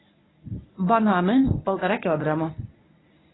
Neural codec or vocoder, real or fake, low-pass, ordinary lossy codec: codec, 24 kHz, 0.9 kbps, WavTokenizer, medium speech release version 1; fake; 7.2 kHz; AAC, 16 kbps